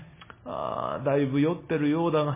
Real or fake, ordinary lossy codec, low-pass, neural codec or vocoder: real; MP3, 16 kbps; 3.6 kHz; none